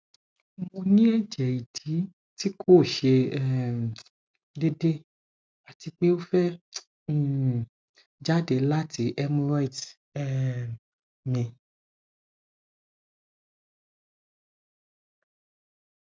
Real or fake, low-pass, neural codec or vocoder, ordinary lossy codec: real; none; none; none